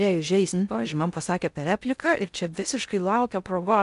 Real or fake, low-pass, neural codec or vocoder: fake; 10.8 kHz; codec, 16 kHz in and 24 kHz out, 0.6 kbps, FocalCodec, streaming, 4096 codes